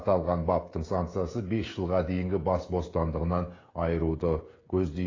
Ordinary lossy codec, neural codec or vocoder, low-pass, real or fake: AAC, 32 kbps; codec, 16 kHz, 16 kbps, FreqCodec, smaller model; 7.2 kHz; fake